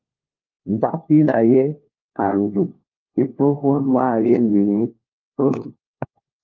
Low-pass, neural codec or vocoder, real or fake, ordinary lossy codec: 7.2 kHz; codec, 16 kHz, 1 kbps, FunCodec, trained on LibriTTS, 50 frames a second; fake; Opus, 32 kbps